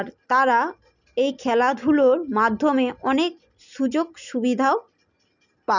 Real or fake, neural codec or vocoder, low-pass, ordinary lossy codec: real; none; 7.2 kHz; none